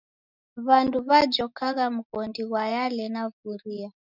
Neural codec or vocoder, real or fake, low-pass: none; real; 5.4 kHz